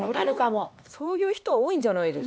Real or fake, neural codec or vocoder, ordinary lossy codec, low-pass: fake; codec, 16 kHz, 2 kbps, X-Codec, HuBERT features, trained on LibriSpeech; none; none